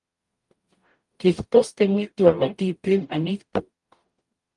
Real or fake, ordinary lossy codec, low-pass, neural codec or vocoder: fake; Opus, 32 kbps; 10.8 kHz; codec, 44.1 kHz, 0.9 kbps, DAC